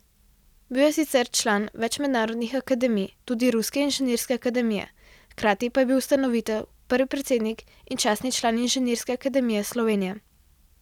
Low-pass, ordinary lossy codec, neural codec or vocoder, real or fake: 19.8 kHz; none; none; real